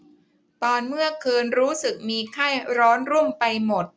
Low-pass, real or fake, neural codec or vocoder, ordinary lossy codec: none; real; none; none